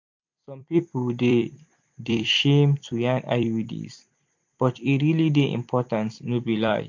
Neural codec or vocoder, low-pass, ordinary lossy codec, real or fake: vocoder, 44.1 kHz, 128 mel bands every 256 samples, BigVGAN v2; 7.2 kHz; MP3, 48 kbps; fake